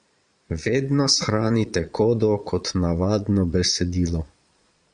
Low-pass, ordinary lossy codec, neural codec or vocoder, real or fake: 9.9 kHz; Opus, 64 kbps; vocoder, 22.05 kHz, 80 mel bands, Vocos; fake